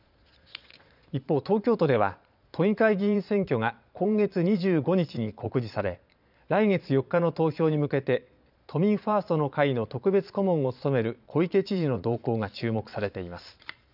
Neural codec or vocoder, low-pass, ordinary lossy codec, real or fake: vocoder, 22.05 kHz, 80 mel bands, Vocos; 5.4 kHz; none; fake